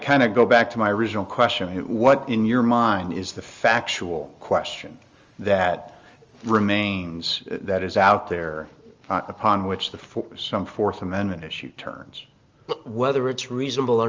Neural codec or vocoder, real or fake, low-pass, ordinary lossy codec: none; real; 7.2 kHz; Opus, 32 kbps